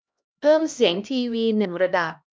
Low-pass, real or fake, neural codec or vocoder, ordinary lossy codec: none; fake; codec, 16 kHz, 1 kbps, X-Codec, HuBERT features, trained on LibriSpeech; none